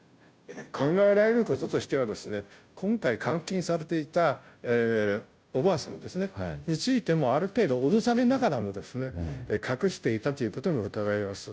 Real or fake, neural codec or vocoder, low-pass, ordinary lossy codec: fake; codec, 16 kHz, 0.5 kbps, FunCodec, trained on Chinese and English, 25 frames a second; none; none